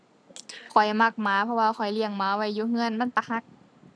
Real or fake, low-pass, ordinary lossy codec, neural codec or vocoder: real; none; none; none